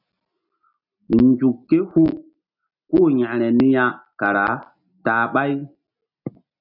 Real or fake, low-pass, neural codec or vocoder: real; 5.4 kHz; none